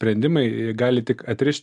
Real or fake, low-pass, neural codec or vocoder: real; 10.8 kHz; none